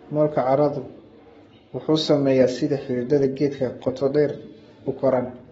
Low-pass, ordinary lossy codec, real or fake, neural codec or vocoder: 19.8 kHz; AAC, 24 kbps; fake; codec, 44.1 kHz, 7.8 kbps, Pupu-Codec